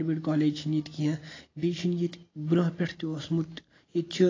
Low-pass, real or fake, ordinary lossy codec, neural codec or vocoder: 7.2 kHz; real; AAC, 32 kbps; none